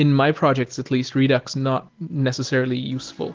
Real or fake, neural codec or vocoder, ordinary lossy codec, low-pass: real; none; Opus, 16 kbps; 7.2 kHz